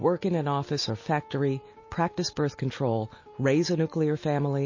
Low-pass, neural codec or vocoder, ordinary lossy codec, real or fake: 7.2 kHz; none; MP3, 32 kbps; real